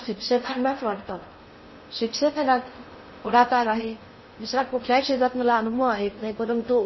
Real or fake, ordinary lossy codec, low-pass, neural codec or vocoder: fake; MP3, 24 kbps; 7.2 kHz; codec, 16 kHz in and 24 kHz out, 0.6 kbps, FocalCodec, streaming, 4096 codes